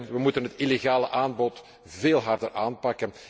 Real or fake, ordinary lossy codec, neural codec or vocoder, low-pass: real; none; none; none